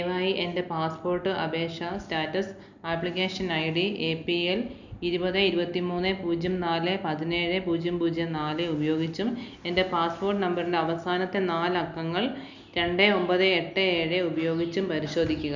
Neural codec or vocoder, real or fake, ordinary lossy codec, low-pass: none; real; none; 7.2 kHz